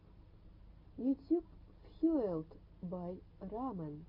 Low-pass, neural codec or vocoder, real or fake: 5.4 kHz; none; real